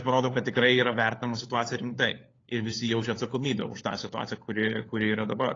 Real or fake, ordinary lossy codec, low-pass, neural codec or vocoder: fake; AAC, 32 kbps; 7.2 kHz; codec, 16 kHz, 4 kbps, FunCodec, trained on LibriTTS, 50 frames a second